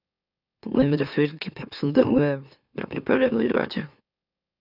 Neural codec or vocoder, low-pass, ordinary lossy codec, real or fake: autoencoder, 44.1 kHz, a latent of 192 numbers a frame, MeloTTS; 5.4 kHz; none; fake